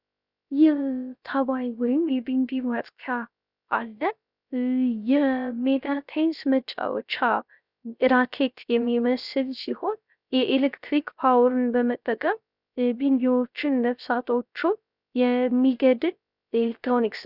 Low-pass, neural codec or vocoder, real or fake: 5.4 kHz; codec, 16 kHz, 0.3 kbps, FocalCodec; fake